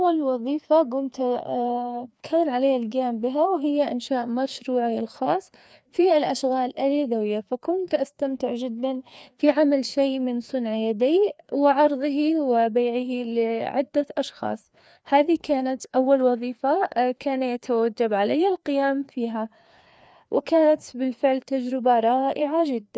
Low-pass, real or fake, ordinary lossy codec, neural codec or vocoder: none; fake; none; codec, 16 kHz, 2 kbps, FreqCodec, larger model